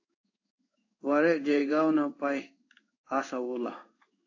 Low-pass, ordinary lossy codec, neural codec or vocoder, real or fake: 7.2 kHz; AAC, 48 kbps; codec, 16 kHz in and 24 kHz out, 1 kbps, XY-Tokenizer; fake